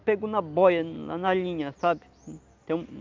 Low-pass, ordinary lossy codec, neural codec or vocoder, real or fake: 7.2 kHz; Opus, 24 kbps; none; real